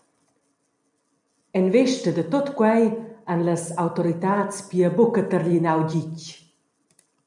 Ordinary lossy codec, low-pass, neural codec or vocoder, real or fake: MP3, 96 kbps; 10.8 kHz; none; real